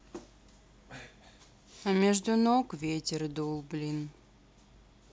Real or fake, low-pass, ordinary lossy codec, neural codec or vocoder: real; none; none; none